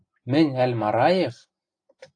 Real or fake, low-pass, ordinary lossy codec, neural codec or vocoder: real; 9.9 kHz; AAC, 48 kbps; none